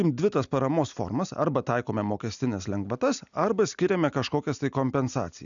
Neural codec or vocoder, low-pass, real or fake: none; 7.2 kHz; real